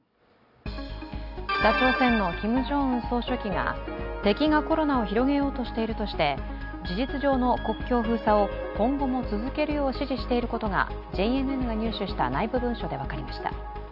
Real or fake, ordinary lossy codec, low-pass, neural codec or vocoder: real; none; 5.4 kHz; none